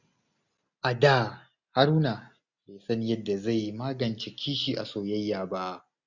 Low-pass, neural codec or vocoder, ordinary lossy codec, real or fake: 7.2 kHz; none; none; real